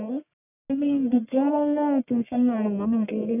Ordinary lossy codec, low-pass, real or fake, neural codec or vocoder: none; 3.6 kHz; fake; codec, 44.1 kHz, 1.7 kbps, Pupu-Codec